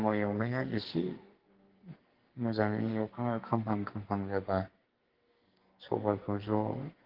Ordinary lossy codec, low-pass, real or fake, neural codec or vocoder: Opus, 16 kbps; 5.4 kHz; fake; codec, 44.1 kHz, 2.6 kbps, SNAC